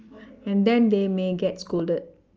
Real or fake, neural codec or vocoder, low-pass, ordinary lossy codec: real; none; 7.2 kHz; Opus, 24 kbps